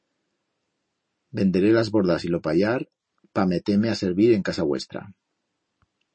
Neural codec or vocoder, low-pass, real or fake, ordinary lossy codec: none; 9.9 kHz; real; MP3, 32 kbps